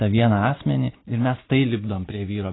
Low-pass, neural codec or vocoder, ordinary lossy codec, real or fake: 7.2 kHz; none; AAC, 16 kbps; real